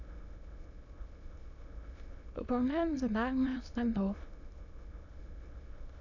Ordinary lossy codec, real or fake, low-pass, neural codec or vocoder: none; fake; 7.2 kHz; autoencoder, 22.05 kHz, a latent of 192 numbers a frame, VITS, trained on many speakers